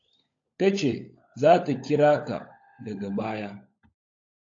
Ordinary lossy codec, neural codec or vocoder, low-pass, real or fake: AAC, 48 kbps; codec, 16 kHz, 16 kbps, FunCodec, trained on LibriTTS, 50 frames a second; 7.2 kHz; fake